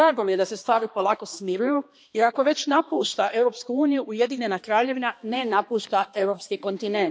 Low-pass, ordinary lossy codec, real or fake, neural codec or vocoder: none; none; fake; codec, 16 kHz, 2 kbps, X-Codec, HuBERT features, trained on balanced general audio